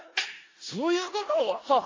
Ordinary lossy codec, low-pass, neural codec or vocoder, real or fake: AAC, 32 kbps; 7.2 kHz; codec, 16 kHz in and 24 kHz out, 0.4 kbps, LongCat-Audio-Codec, four codebook decoder; fake